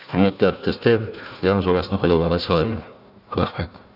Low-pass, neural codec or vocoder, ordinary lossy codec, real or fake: 5.4 kHz; codec, 16 kHz, 1 kbps, FunCodec, trained on Chinese and English, 50 frames a second; none; fake